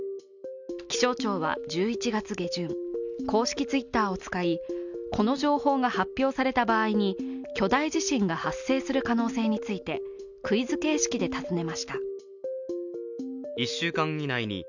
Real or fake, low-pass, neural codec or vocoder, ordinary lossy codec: real; 7.2 kHz; none; none